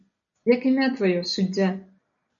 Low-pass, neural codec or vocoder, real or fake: 7.2 kHz; none; real